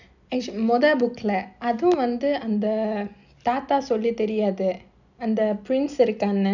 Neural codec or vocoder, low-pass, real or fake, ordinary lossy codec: none; 7.2 kHz; real; none